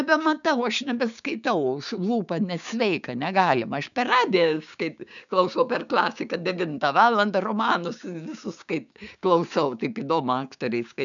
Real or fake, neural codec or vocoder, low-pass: fake; codec, 16 kHz, 6 kbps, DAC; 7.2 kHz